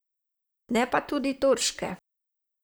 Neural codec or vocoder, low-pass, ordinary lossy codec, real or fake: vocoder, 44.1 kHz, 128 mel bands, Pupu-Vocoder; none; none; fake